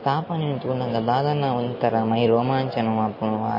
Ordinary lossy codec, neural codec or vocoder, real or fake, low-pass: MP3, 24 kbps; none; real; 5.4 kHz